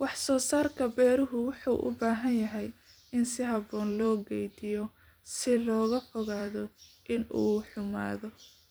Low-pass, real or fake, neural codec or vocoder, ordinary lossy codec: none; fake; vocoder, 44.1 kHz, 128 mel bands every 256 samples, BigVGAN v2; none